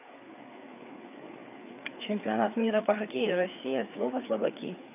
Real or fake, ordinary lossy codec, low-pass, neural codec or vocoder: fake; none; 3.6 kHz; codec, 16 kHz, 4 kbps, FreqCodec, larger model